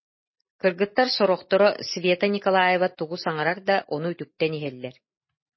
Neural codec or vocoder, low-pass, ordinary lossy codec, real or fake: none; 7.2 kHz; MP3, 24 kbps; real